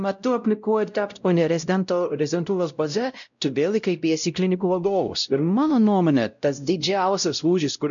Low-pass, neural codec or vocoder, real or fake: 7.2 kHz; codec, 16 kHz, 0.5 kbps, X-Codec, WavLM features, trained on Multilingual LibriSpeech; fake